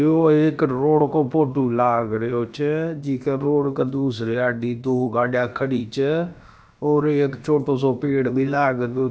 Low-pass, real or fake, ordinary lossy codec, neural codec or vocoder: none; fake; none; codec, 16 kHz, about 1 kbps, DyCAST, with the encoder's durations